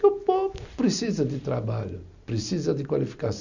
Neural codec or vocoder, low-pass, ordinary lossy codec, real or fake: none; 7.2 kHz; none; real